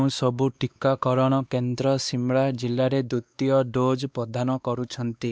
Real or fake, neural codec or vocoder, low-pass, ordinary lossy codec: fake; codec, 16 kHz, 2 kbps, X-Codec, WavLM features, trained on Multilingual LibriSpeech; none; none